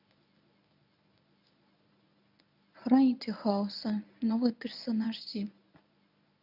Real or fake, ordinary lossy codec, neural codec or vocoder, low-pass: fake; none; codec, 24 kHz, 0.9 kbps, WavTokenizer, medium speech release version 1; 5.4 kHz